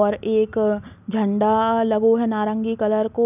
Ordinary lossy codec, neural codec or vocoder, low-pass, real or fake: none; none; 3.6 kHz; real